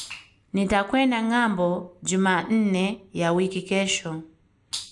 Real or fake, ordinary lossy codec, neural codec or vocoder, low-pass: real; none; none; 10.8 kHz